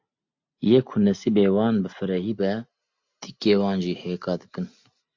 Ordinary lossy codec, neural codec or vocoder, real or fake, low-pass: MP3, 48 kbps; none; real; 7.2 kHz